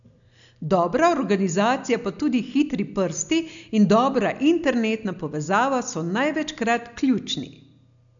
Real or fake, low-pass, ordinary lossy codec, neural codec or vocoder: real; 7.2 kHz; none; none